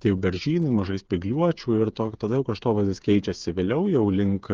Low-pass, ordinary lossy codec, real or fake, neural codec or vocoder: 7.2 kHz; Opus, 32 kbps; fake; codec, 16 kHz, 8 kbps, FreqCodec, smaller model